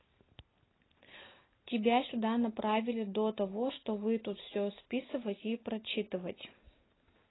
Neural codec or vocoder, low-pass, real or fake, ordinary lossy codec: none; 7.2 kHz; real; AAC, 16 kbps